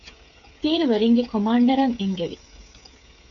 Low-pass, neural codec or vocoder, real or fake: 7.2 kHz; codec, 16 kHz, 8 kbps, FreqCodec, smaller model; fake